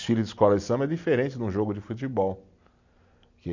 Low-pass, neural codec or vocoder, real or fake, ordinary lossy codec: 7.2 kHz; none; real; none